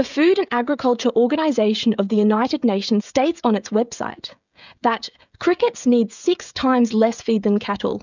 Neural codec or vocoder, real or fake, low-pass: codec, 16 kHz, 16 kbps, FreqCodec, smaller model; fake; 7.2 kHz